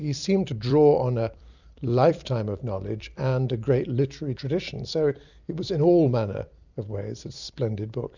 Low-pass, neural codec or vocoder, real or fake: 7.2 kHz; vocoder, 44.1 kHz, 128 mel bands every 256 samples, BigVGAN v2; fake